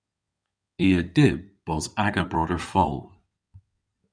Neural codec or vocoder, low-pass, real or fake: codec, 16 kHz in and 24 kHz out, 2.2 kbps, FireRedTTS-2 codec; 9.9 kHz; fake